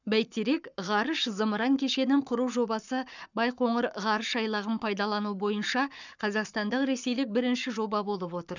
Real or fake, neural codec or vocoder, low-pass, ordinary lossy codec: fake; codec, 44.1 kHz, 7.8 kbps, Pupu-Codec; 7.2 kHz; none